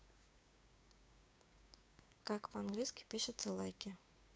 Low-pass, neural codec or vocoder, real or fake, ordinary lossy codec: none; codec, 16 kHz, 6 kbps, DAC; fake; none